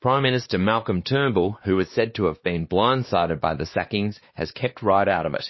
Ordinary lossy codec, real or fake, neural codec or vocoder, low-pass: MP3, 24 kbps; fake; codec, 16 kHz, 2 kbps, X-Codec, WavLM features, trained on Multilingual LibriSpeech; 7.2 kHz